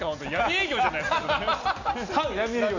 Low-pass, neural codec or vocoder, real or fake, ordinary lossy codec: 7.2 kHz; none; real; none